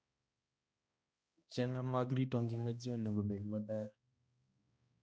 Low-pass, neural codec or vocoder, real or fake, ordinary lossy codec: none; codec, 16 kHz, 1 kbps, X-Codec, HuBERT features, trained on balanced general audio; fake; none